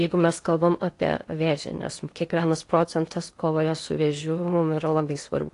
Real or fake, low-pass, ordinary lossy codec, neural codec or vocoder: fake; 10.8 kHz; AAC, 48 kbps; codec, 16 kHz in and 24 kHz out, 0.8 kbps, FocalCodec, streaming, 65536 codes